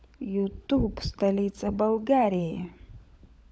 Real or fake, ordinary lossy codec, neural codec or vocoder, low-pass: fake; none; codec, 16 kHz, 16 kbps, FunCodec, trained on LibriTTS, 50 frames a second; none